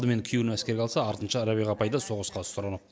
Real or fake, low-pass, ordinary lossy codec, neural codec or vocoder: real; none; none; none